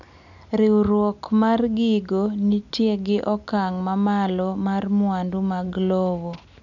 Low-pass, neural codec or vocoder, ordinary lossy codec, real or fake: 7.2 kHz; none; none; real